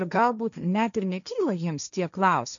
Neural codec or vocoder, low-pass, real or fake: codec, 16 kHz, 1.1 kbps, Voila-Tokenizer; 7.2 kHz; fake